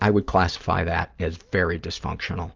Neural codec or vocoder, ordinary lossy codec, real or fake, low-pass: none; Opus, 24 kbps; real; 7.2 kHz